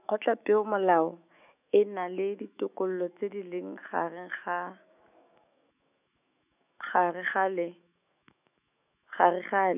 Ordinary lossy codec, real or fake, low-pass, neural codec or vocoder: none; fake; 3.6 kHz; autoencoder, 48 kHz, 128 numbers a frame, DAC-VAE, trained on Japanese speech